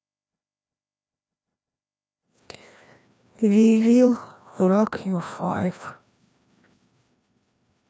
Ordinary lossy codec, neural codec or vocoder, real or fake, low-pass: none; codec, 16 kHz, 1 kbps, FreqCodec, larger model; fake; none